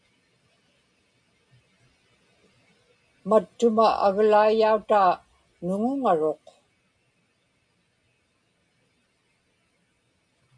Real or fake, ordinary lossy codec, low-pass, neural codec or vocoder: real; MP3, 96 kbps; 9.9 kHz; none